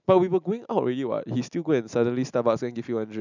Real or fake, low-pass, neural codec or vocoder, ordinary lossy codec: real; 7.2 kHz; none; none